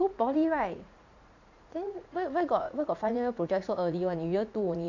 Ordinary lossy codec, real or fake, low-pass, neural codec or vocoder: none; fake; 7.2 kHz; vocoder, 44.1 kHz, 80 mel bands, Vocos